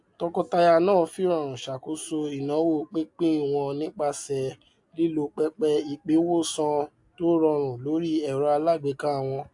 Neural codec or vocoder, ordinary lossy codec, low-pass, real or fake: none; AAC, 64 kbps; 10.8 kHz; real